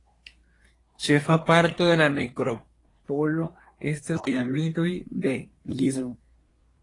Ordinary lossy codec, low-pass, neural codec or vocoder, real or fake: AAC, 32 kbps; 10.8 kHz; codec, 24 kHz, 1 kbps, SNAC; fake